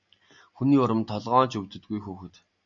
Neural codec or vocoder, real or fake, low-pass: none; real; 7.2 kHz